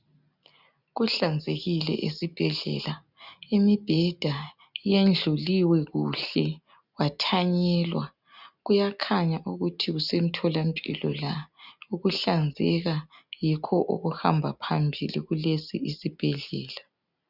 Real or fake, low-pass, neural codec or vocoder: real; 5.4 kHz; none